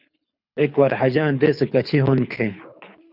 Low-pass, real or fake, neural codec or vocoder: 5.4 kHz; fake; codec, 24 kHz, 6 kbps, HILCodec